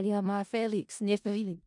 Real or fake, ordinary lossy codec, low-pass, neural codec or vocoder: fake; none; 10.8 kHz; codec, 16 kHz in and 24 kHz out, 0.4 kbps, LongCat-Audio-Codec, four codebook decoder